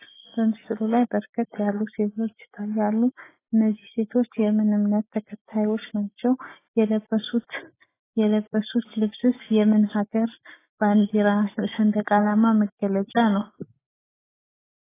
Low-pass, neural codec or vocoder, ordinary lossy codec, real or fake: 3.6 kHz; none; AAC, 16 kbps; real